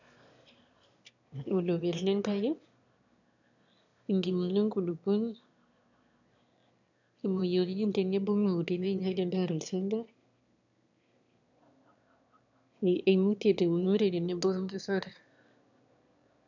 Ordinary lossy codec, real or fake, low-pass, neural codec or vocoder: none; fake; 7.2 kHz; autoencoder, 22.05 kHz, a latent of 192 numbers a frame, VITS, trained on one speaker